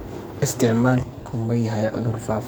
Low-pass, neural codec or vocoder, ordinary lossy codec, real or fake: none; codec, 44.1 kHz, 2.6 kbps, SNAC; none; fake